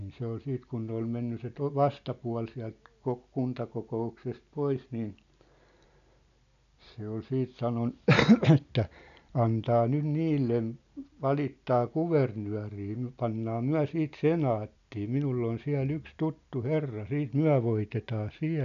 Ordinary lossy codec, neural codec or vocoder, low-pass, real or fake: none; none; 7.2 kHz; real